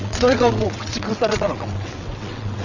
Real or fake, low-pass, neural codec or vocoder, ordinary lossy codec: fake; 7.2 kHz; vocoder, 22.05 kHz, 80 mel bands, WaveNeXt; none